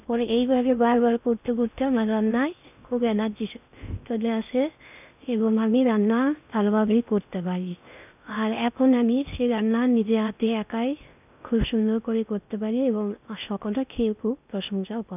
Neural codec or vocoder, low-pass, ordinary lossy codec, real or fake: codec, 16 kHz in and 24 kHz out, 0.6 kbps, FocalCodec, streaming, 4096 codes; 3.6 kHz; none; fake